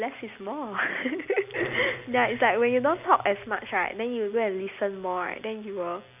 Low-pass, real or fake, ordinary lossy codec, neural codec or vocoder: 3.6 kHz; real; none; none